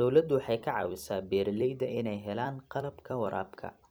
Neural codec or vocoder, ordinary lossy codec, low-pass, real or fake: vocoder, 44.1 kHz, 128 mel bands every 256 samples, BigVGAN v2; none; none; fake